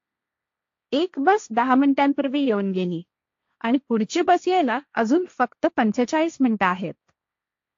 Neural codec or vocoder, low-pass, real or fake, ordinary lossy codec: codec, 16 kHz, 1.1 kbps, Voila-Tokenizer; 7.2 kHz; fake; AAC, 48 kbps